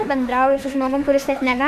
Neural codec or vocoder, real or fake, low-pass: autoencoder, 48 kHz, 32 numbers a frame, DAC-VAE, trained on Japanese speech; fake; 14.4 kHz